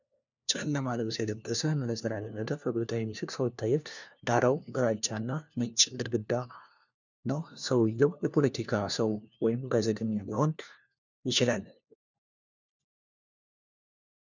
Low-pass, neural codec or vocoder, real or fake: 7.2 kHz; codec, 16 kHz, 1 kbps, FunCodec, trained on LibriTTS, 50 frames a second; fake